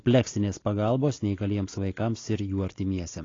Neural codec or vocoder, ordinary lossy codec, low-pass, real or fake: none; AAC, 32 kbps; 7.2 kHz; real